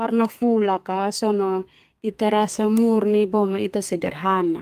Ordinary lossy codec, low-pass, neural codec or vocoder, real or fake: Opus, 32 kbps; 14.4 kHz; codec, 32 kHz, 1.9 kbps, SNAC; fake